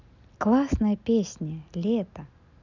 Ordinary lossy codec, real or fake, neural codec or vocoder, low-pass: none; real; none; 7.2 kHz